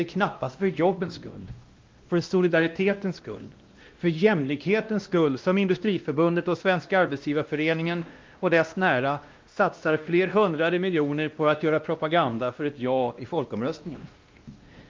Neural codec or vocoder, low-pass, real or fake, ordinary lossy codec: codec, 16 kHz, 1 kbps, X-Codec, WavLM features, trained on Multilingual LibriSpeech; 7.2 kHz; fake; Opus, 24 kbps